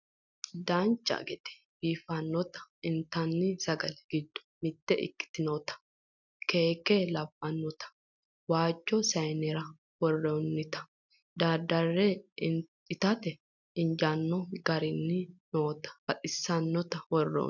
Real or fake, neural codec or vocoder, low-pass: real; none; 7.2 kHz